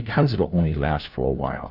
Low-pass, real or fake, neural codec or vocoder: 5.4 kHz; fake; codec, 16 kHz, 1 kbps, FunCodec, trained on LibriTTS, 50 frames a second